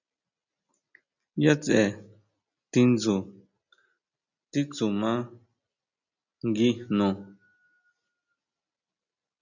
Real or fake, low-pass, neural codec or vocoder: real; 7.2 kHz; none